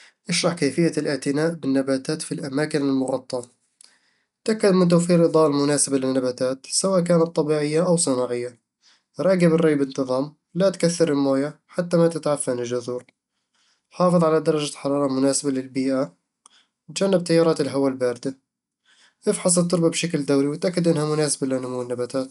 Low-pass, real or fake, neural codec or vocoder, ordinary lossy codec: 10.8 kHz; real; none; none